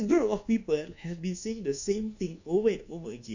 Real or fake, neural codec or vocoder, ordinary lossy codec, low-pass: fake; codec, 24 kHz, 1.2 kbps, DualCodec; none; 7.2 kHz